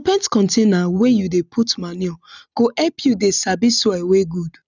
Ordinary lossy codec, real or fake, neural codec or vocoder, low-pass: none; real; none; 7.2 kHz